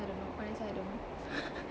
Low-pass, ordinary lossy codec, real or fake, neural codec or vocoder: none; none; real; none